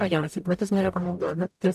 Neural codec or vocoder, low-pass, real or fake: codec, 44.1 kHz, 0.9 kbps, DAC; 14.4 kHz; fake